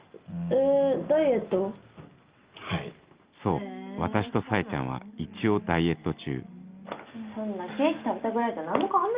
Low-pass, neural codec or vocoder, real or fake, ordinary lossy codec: 3.6 kHz; none; real; Opus, 32 kbps